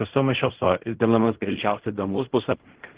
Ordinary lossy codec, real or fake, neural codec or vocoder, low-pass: Opus, 16 kbps; fake; codec, 16 kHz in and 24 kHz out, 0.4 kbps, LongCat-Audio-Codec, fine tuned four codebook decoder; 3.6 kHz